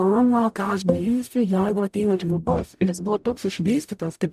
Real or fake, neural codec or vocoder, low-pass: fake; codec, 44.1 kHz, 0.9 kbps, DAC; 14.4 kHz